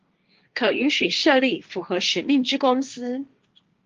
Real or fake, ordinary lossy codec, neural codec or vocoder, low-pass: fake; Opus, 32 kbps; codec, 16 kHz, 1.1 kbps, Voila-Tokenizer; 7.2 kHz